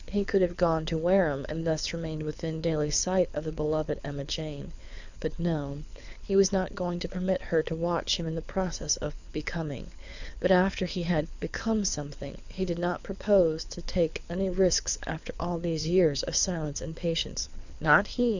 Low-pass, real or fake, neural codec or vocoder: 7.2 kHz; fake; codec, 24 kHz, 6 kbps, HILCodec